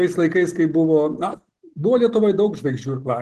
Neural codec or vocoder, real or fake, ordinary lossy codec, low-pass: none; real; Opus, 16 kbps; 10.8 kHz